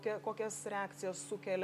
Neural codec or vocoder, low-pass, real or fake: none; 14.4 kHz; real